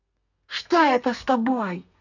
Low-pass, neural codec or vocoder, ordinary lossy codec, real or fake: 7.2 kHz; codec, 32 kHz, 1.9 kbps, SNAC; none; fake